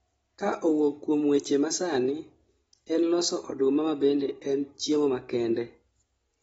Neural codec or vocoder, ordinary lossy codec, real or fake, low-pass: none; AAC, 24 kbps; real; 19.8 kHz